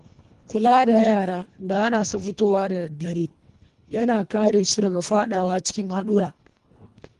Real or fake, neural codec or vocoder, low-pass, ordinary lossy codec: fake; codec, 24 kHz, 1.5 kbps, HILCodec; 10.8 kHz; Opus, 24 kbps